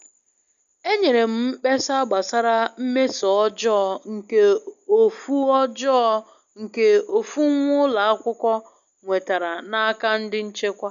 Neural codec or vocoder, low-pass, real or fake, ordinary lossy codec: none; 7.2 kHz; real; none